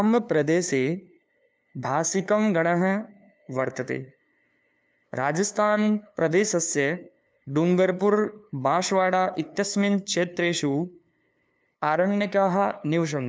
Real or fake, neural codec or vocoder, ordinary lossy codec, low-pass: fake; codec, 16 kHz, 2 kbps, FunCodec, trained on LibriTTS, 25 frames a second; none; none